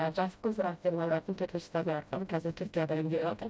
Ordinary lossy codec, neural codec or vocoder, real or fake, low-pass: none; codec, 16 kHz, 0.5 kbps, FreqCodec, smaller model; fake; none